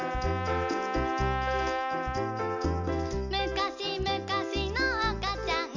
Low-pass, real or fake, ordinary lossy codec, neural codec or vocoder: 7.2 kHz; real; none; none